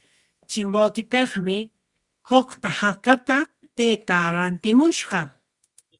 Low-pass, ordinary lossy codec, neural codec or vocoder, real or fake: 10.8 kHz; Opus, 64 kbps; codec, 24 kHz, 0.9 kbps, WavTokenizer, medium music audio release; fake